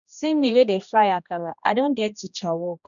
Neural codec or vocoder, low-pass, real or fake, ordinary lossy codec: codec, 16 kHz, 1 kbps, X-Codec, HuBERT features, trained on general audio; 7.2 kHz; fake; none